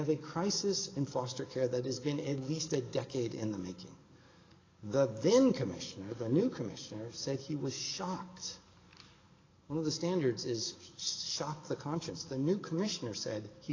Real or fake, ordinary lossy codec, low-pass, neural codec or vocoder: fake; AAC, 32 kbps; 7.2 kHz; codec, 44.1 kHz, 7.8 kbps, DAC